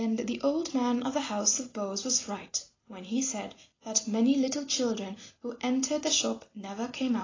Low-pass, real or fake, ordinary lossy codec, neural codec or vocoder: 7.2 kHz; real; AAC, 32 kbps; none